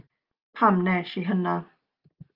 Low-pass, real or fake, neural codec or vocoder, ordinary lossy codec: 5.4 kHz; real; none; Opus, 24 kbps